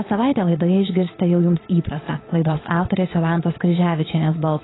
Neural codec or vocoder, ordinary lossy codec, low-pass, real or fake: vocoder, 22.05 kHz, 80 mel bands, Vocos; AAC, 16 kbps; 7.2 kHz; fake